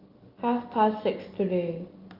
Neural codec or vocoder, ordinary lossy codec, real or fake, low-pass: none; Opus, 24 kbps; real; 5.4 kHz